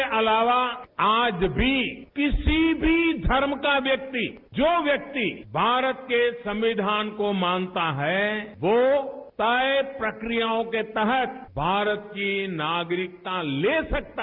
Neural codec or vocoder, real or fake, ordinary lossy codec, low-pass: none; real; Opus, 24 kbps; 5.4 kHz